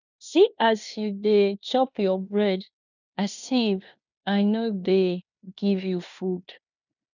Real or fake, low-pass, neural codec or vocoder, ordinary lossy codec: fake; 7.2 kHz; codec, 16 kHz in and 24 kHz out, 0.9 kbps, LongCat-Audio-Codec, fine tuned four codebook decoder; none